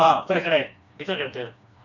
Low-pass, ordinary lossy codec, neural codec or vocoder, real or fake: 7.2 kHz; none; codec, 16 kHz, 2 kbps, FreqCodec, smaller model; fake